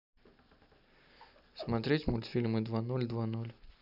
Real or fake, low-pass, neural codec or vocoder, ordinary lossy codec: real; 5.4 kHz; none; none